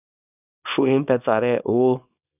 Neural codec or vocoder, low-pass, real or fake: codec, 24 kHz, 0.9 kbps, WavTokenizer, small release; 3.6 kHz; fake